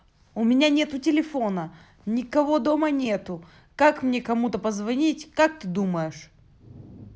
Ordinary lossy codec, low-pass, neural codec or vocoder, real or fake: none; none; none; real